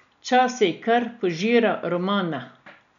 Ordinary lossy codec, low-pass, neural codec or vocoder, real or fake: none; 7.2 kHz; none; real